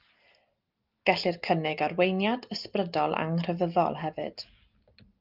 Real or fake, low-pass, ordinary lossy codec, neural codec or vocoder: real; 5.4 kHz; Opus, 24 kbps; none